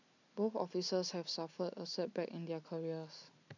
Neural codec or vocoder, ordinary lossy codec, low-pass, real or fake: none; none; 7.2 kHz; real